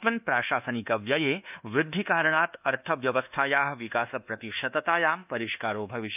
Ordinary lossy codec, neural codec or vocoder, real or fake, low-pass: none; codec, 24 kHz, 1.2 kbps, DualCodec; fake; 3.6 kHz